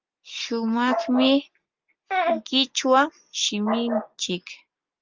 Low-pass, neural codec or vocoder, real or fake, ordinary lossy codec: 7.2 kHz; none; real; Opus, 32 kbps